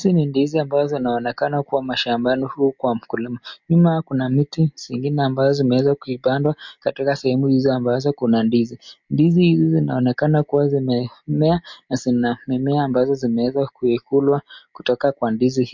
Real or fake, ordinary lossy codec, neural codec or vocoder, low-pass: real; MP3, 48 kbps; none; 7.2 kHz